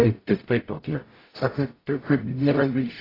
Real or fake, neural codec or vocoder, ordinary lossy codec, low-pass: fake; codec, 44.1 kHz, 0.9 kbps, DAC; AAC, 24 kbps; 5.4 kHz